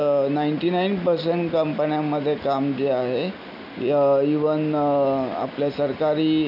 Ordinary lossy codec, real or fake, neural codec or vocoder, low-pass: none; real; none; 5.4 kHz